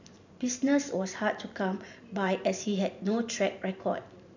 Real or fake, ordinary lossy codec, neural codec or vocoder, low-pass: fake; none; vocoder, 44.1 kHz, 128 mel bands every 512 samples, BigVGAN v2; 7.2 kHz